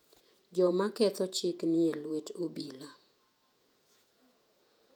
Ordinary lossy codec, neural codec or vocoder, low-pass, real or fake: none; vocoder, 48 kHz, 128 mel bands, Vocos; 19.8 kHz; fake